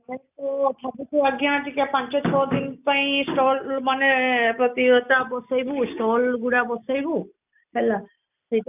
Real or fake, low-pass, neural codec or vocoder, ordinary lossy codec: real; 3.6 kHz; none; none